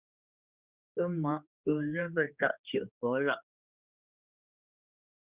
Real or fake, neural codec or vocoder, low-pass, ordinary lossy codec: fake; codec, 16 kHz, 2 kbps, X-Codec, HuBERT features, trained on general audio; 3.6 kHz; Opus, 64 kbps